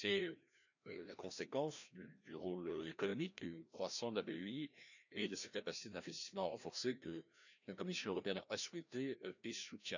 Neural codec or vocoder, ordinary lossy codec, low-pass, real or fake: codec, 16 kHz, 1 kbps, FreqCodec, larger model; none; 7.2 kHz; fake